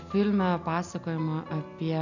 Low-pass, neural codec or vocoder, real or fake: 7.2 kHz; none; real